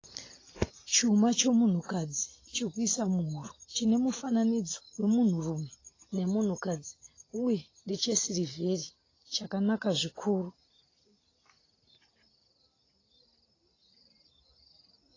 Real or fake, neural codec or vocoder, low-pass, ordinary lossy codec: real; none; 7.2 kHz; AAC, 32 kbps